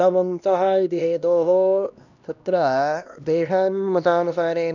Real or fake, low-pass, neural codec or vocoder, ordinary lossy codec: fake; 7.2 kHz; codec, 16 kHz, 1 kbps, X-Codec, HuBERT features, trained on LibriSpeech; none